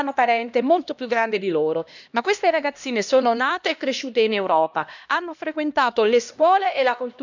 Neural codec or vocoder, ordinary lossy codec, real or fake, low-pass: codec, 16 kHz, 1 kbps, X-Codec, HuBERT features, trained on LibriSpeech; none; fake; 7.2 kHz